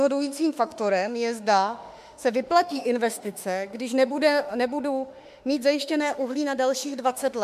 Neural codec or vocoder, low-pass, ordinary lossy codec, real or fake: autoencoder, 48 kHz, 32 numbers a frame, DAC-VAE, trained on Japanese speech; 14.4 kHz; MP3, 96 kbps; fake